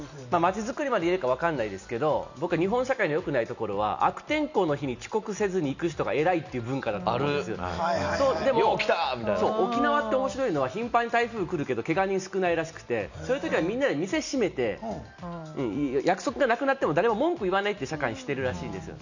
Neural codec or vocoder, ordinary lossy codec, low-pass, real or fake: none; none; 7.2 kHz; real